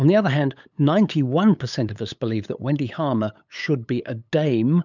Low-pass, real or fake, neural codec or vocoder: 7.2 kHz; fake; codec, 16 kHz, 8 kbps, FunCodec, trained on LibriTTS, 25 frames a second